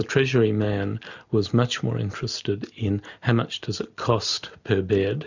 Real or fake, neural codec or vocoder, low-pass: real; none; 7.2 kHz